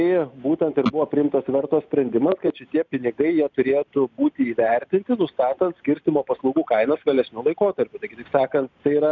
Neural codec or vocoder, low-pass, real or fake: none; 7.2 kHz; real